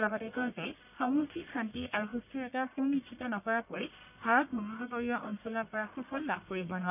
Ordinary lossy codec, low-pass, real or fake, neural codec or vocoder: none; 3.6 kHz; fake; codec, 44.1 kHz, 1.7 kbps, Pupu-Codec